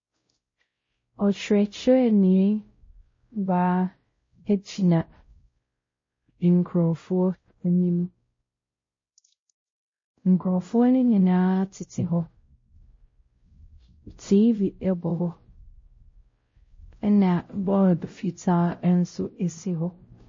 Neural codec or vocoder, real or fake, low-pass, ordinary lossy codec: codec, 16 kHz, 0.5 kbps, X-Codec, WavLM features, trained on Multilingual LibriSpeech; fake; 7.2 kHz; MP3, 32 kbps